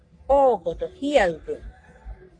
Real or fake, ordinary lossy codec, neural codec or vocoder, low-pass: fake; AAC, 48 kbps; codec, 44.1 kHz, 3.4 kbps, Pupu-Codec; 9.9 kHz